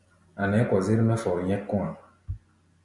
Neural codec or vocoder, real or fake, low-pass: none; real; 10.8 kHz